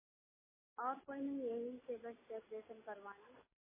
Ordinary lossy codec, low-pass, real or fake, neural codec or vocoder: MP3, 16 kbps; 3.6 kHz; real; none